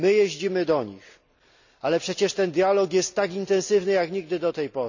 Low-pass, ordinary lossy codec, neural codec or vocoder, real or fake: 7.2 kHz; none; none; real